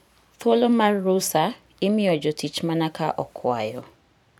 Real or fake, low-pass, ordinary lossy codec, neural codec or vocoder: fake; 19.8 kHz; none; vocoder, 44.1 kHz, 128 mel bands every 512 samples, BigVGAN v2